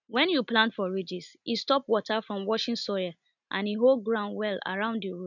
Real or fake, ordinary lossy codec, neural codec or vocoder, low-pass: real; none; none; 7.2 kHz